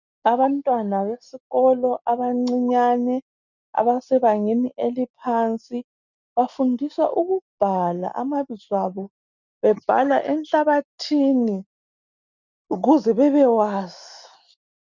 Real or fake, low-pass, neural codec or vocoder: real; 7.2 kHz; none